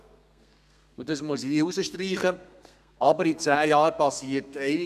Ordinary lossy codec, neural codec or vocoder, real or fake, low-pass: none; codec, 32 kHz, 1.9 kbps, SNAC; fake; 14.4 kHz